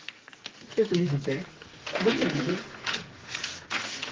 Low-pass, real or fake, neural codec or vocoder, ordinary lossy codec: 7.2 kHz; fake; codec, 44.1 kHz, 2.6 kbps, SNAC; Opus, 16 kbps